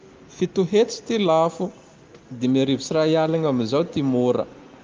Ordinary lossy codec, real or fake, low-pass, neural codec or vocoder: Opus, 24 kbps; real; 7.2 kHz; none